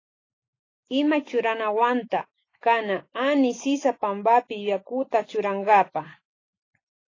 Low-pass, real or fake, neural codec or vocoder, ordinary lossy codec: 7.2 kHz; real; none; AAC, 32 kbps